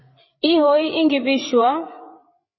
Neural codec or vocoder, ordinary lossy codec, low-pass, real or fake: codec, 16 kHz, 8 kbps, FreqCodec, larger model; MP3, 24 kbps; 7.2 kHz; fake